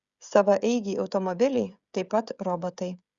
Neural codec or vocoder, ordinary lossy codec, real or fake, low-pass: codec, 16 kHz, 16 kbps, FreqCodec, smaller model; Opus, 64 kbps; fake; 7.2 kHz